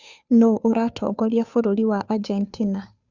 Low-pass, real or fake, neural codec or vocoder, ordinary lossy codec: 7.2 kHz; fake; codec, 16 kHz, 2 kbps, FunCodec, trained on Chinese and English, 25 frames a second; Opus, 64 kbps